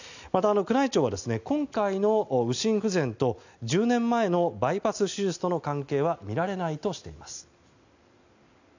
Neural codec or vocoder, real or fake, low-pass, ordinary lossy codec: none; real; 7.2 kHz; none